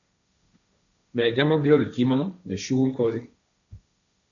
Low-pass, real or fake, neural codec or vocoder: 7.2 kHz; fake; codec, 16 kHz, 1.1 kbps, Voila-Tokenizer